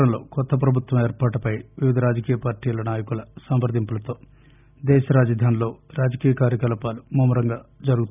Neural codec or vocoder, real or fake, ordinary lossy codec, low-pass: none; real; none; 3.6 kHz